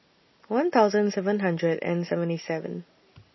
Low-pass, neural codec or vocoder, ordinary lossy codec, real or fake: 7.2 kHz; none; MP3, 24 kbps; real